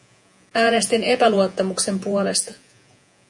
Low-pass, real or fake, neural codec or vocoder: 10.8 kHz; fake; vocoder, 48 kHz, 128 mel bands, Vocos